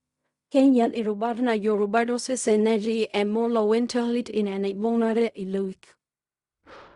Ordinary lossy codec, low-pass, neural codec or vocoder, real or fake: Opus, 64 kbps; 10.8 kHz; codec, 16 kHz in and 24 kHz out, 0.4 kbps, LongCat-Audio-Codec, fine tuned four codebook decoder; fake